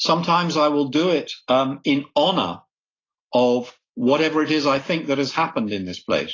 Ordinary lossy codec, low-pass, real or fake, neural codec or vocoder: AAC, 32 kbps; 7.2 kHz; real; none